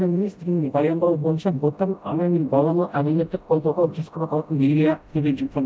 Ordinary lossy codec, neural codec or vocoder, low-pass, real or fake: none; codec, 16 kHz, 0.5 kbps, FreqCodec, smaller model; none; fake